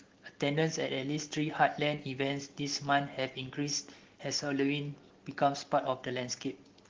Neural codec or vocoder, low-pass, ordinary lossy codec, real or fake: none; 7.2 kHz; Opus, 16 kbps; real